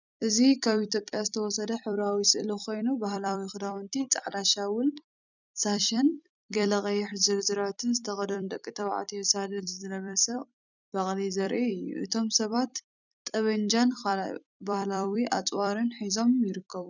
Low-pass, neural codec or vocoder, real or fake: 7.2 kHz; vocoder, 44.1 kHz, 128 mel bands every 256 samples, BigVGAN v2; fake